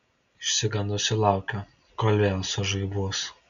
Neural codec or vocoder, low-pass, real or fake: none; 7.2 kHz; real